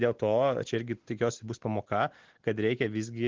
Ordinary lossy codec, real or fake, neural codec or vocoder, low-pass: Opus, 16 kbps; real; none; 7.2 kHz